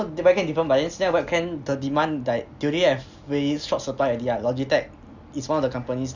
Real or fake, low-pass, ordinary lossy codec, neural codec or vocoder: real; 7.2 kHz; none; none